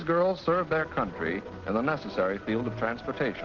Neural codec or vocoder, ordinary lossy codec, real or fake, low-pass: none; Opus, 16 kbps; real; 7.2 kHz